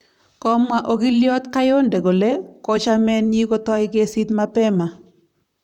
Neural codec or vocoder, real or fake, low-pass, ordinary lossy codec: vocoder, 44.1 kHz, 128 mel bands, Pupu-Vocoder; fake; 19.8 kHz; none